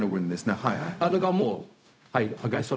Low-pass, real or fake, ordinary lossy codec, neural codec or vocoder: none; fake; none; codec, 16 kHz, 0.4 kbps, LongCat-Audio-Codec